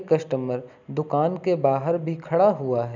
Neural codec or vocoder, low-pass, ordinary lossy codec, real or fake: none; 7.2 kHz; none; real